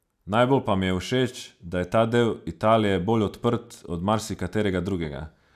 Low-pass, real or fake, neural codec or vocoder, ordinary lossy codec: 14.4 kHz; real; none; none